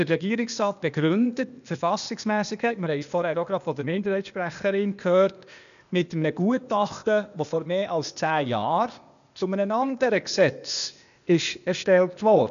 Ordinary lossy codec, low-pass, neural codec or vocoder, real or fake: none; 7.2 kHz; codec, 16 kHz, 0.8 kbps, ZipCodec; fake